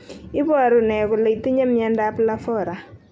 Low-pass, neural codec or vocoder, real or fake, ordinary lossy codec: none; none; real; none